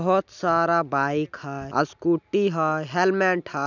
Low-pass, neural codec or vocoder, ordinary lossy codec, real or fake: 7.2 kHz; none; none; real